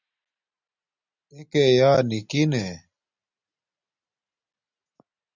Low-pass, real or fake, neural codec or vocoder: 7.2 kHz; real; none